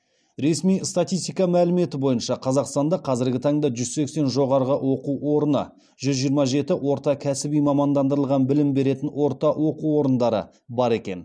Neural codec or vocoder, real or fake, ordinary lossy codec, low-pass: none; real; none; none